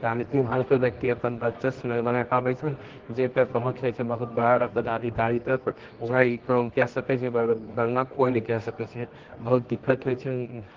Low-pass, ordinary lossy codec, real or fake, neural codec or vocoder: 7.2 kHz; Opus, 16 kbps; fake; codec, 24 kHz, 0.9 kbps, WavTokenizer, medium music audio release